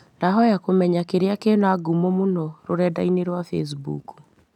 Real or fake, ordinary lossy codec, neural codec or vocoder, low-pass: real; none; none; 19.8 kHz